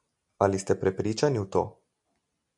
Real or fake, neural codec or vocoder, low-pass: real; none; 10.8 kHz